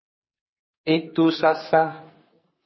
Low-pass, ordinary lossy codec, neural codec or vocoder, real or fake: 7.2 kHz; MP3, 24 kbps; codec, 44.1 kHz, 2.6 kbps, SNAC; fake